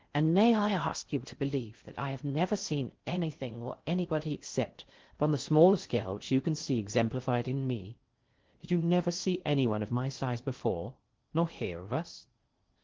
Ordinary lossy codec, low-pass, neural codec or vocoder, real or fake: Opus, 16 kbps; 7.2 kHz; codec, 16 kHz in and 24 kHz out, 0.6 kbps, FocalCodec, streaming, 4096 codes; fake